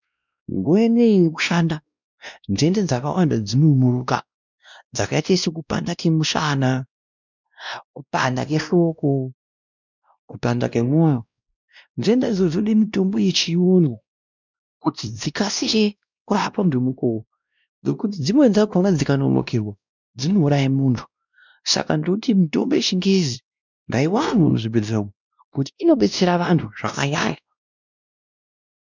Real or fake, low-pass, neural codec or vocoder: fake; 7.2 kHz; codec, 16 kHz, 1 kbps, X-Codec, WavLM features, trained on Multilingual LibriSpeech